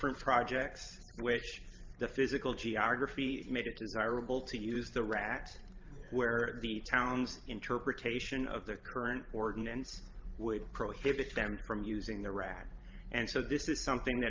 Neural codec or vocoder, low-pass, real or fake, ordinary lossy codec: vocoder, 44.1 kHz, 128 mel bands every 512 samples, BigVGAN v2; 7.2 kHz; fake; Opus, 24 kbps